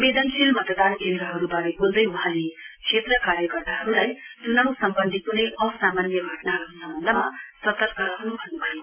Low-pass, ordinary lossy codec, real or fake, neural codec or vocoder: 3.6 kHz; MP3, 24 kbps; real; none